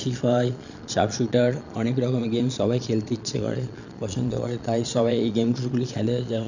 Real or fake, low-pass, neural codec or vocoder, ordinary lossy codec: fake; 7.2 kHz; vocoder, 22.05 kHz, 80 mel bands, Vocos; none